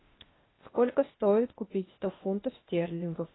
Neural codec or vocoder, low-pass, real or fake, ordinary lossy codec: codec, 16 kHz, 0.8 kbps, ZipCodec; 7.2 kHz; fake; AAC, 16 kbps